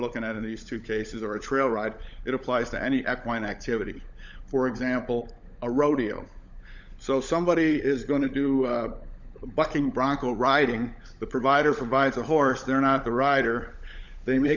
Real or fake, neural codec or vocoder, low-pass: fake; codec, 16 kHz, 16 kbps, FunCodec, trained on LibriTTS, 50 frames a second; 7.2 kHz